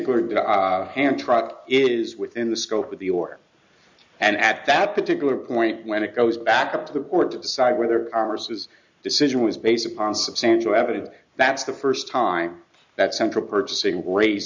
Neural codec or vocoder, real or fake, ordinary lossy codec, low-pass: none; real; MP3, 64 kbps; 7.2 kHz